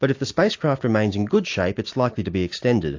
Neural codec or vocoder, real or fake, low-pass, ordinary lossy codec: none; real; 7.2 kHz; AAC, 48 kbps